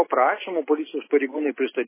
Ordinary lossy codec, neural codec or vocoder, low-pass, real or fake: MP3, 16 kbps; none; 3.6 kHz; real